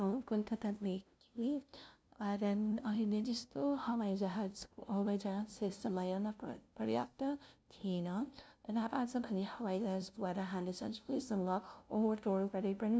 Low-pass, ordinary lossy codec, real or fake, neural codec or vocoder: none; none; fake; codec, 16 kHz, 0.5 kbps, FunCodec, trained on LibriTTS, 25 frames a second